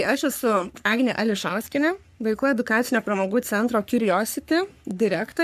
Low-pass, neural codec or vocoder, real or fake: 14.4 kHz; codec, 44.1 kHz, 3.4 kbps, Pupu-Codec; fake